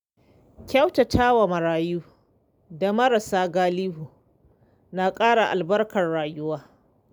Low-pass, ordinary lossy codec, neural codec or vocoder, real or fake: 19.8 kHz; none; none; real